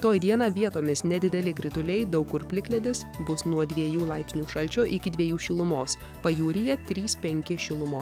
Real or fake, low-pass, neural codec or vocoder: fake; 19.8 kHz; codec, 44.1 kHz, 7.8 kbps, DAC